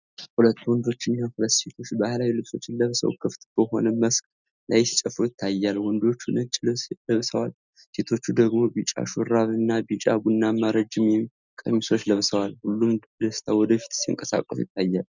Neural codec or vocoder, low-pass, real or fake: none; 7.2 kHz; real